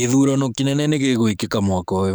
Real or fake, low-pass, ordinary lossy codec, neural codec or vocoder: fake; none; none; codec, 44.1 kHz, 7.8 kbps, DAC